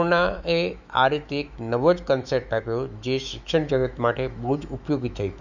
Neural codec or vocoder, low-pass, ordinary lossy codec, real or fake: autoencoder, 48 kHz, 128 numbers a frame, DAC-VAE, trained on Japanese speech; 7.2 kHz; none; fake